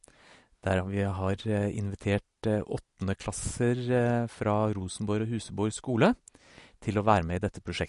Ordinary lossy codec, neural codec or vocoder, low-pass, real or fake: MP3, 48 kbps; none; 10.8 kHz; real